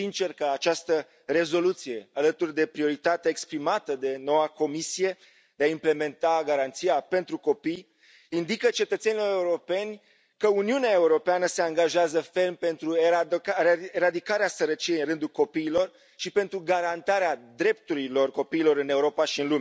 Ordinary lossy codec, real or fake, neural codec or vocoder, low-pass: none; real; none; none